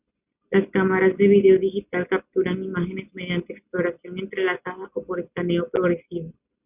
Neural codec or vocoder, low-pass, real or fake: none; 3.6 kHz; real